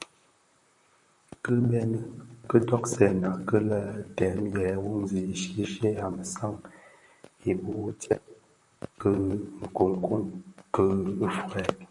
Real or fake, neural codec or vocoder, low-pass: fake; vocoder, 44.1 kHz, 128 mel bands, Pupu-Vocoder; 10.8 kHz